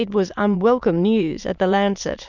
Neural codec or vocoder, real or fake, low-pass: autoencoder, 22.05 kHz, a latent of 192 numbers a frame, VITS, trained on many speakers; fake; 7.2 kHz